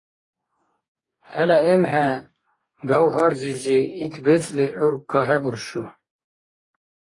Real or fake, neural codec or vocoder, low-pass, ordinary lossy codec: fake; codec, 44.1 kHz, 2.6 kbps, DAC; 10.8 kHz; AAC, 32 kbps